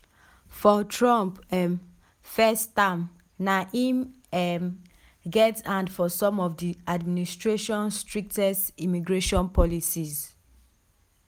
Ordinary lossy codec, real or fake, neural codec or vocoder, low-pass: none; real; none; none